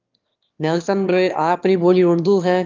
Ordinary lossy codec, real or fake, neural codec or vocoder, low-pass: Opus, 24 kbps; fake; autoencoder, 22.05 kHz, a latent of 192 numbers a frame, VITS, trained on one speaker; 7.2 kHz